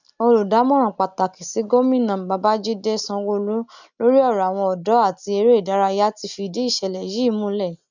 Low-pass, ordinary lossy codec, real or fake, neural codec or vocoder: 7.2 kHz; none; real; none